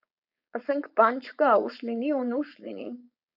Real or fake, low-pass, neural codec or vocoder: fake; 5.4 kHz; codec, 16 kHz, 4.8 kbps, FACodec